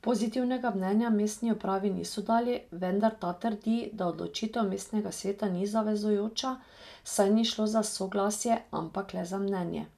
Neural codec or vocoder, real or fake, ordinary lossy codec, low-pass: none; real; none; 14.4 kHz